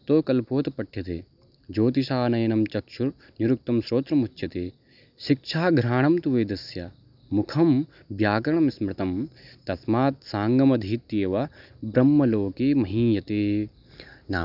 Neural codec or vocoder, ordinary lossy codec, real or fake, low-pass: none; none; real; 5.4 kHz